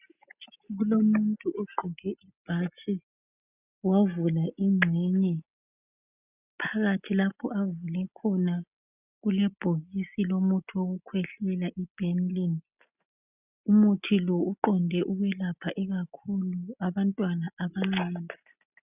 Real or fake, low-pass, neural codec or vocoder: real; 3.6 kHz; none